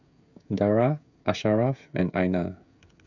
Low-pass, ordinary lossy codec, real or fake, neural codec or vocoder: 7.2 kHz; none; fake; codec, 16 kHz, 16 kbps, FreqCodec, smaller model